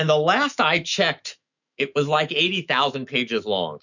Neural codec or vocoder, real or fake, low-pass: autoencoder, 48 kHz, 128 numbers a frame, DAC-VAE, trained on Japanese speech; fake; 7.2 kHz